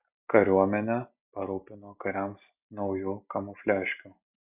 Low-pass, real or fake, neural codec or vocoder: 3.6 kHz; real; none